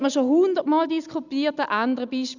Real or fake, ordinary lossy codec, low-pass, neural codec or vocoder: real; none; 7.2 kHz; none